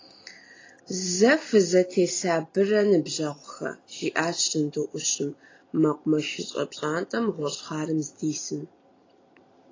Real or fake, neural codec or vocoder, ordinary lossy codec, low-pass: real; none; AAC, 32 kbps; 7.2 kHz